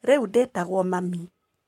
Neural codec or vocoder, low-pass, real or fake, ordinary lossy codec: codec, 44.1 kHz, 7.8 kbps, Pupu-Codec; 19.8 kHz; fake; MP3, 64 kbps